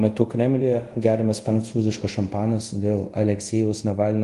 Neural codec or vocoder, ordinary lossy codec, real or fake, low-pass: codec, 24 kHz, 0.5 kbps, DualCodec; Opus, 24 kbps; fake; 10.8 kHz